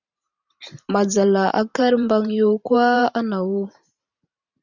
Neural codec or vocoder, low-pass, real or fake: vocoder, 44.1 kHz, 128 mel bands every 512 samples, BigVGAN v2; 7.2 kHz; fake